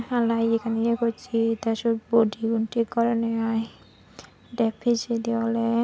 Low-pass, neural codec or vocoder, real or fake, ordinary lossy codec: none; none; real; none